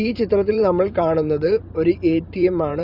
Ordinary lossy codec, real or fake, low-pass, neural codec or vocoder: Opus, 64 kbps; fake; 5.4 kHz; vocoder, 44.1 kHz, 128 mel bands every 512 samples, BigVGAN v2